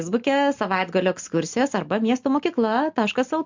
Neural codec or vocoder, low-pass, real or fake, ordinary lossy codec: none; 7.2 kHz; real; MP3, 64 kbps